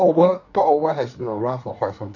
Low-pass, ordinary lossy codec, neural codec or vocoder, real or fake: 7.2 kHz; none; codec, 24 kHz, 1 kbps, SNAC; fake